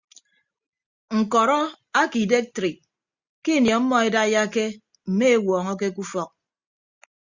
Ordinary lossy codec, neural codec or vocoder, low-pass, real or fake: Opus, 64 kbps; none; 7.2 kHz; real